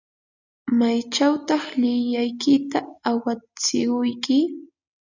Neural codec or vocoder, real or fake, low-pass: none; real; 7.2 kHz